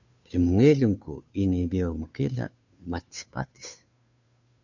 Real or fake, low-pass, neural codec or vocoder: fake; 7.2 kHz; codec, 16 kHz, 2 kbps, FunCodec, trained on Chinese and English, 25 frames a second